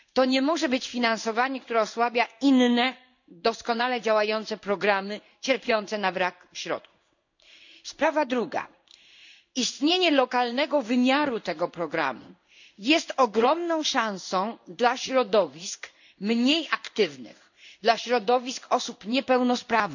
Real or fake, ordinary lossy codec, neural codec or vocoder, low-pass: fake; none; codec, 16 kHz in and 24 kHz out, 1 kbps, XY-Tokenizer; 7.2 kHz